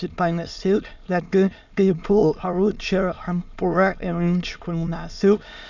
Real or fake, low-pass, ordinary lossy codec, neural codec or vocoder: fake; 7.2 kHz; none; autoencoder, 22.05 kHz, a latent of 192 numbers a frame, VITS, trained on many speakers